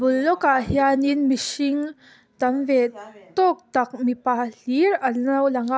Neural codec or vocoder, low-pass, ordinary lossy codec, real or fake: none; none; none; real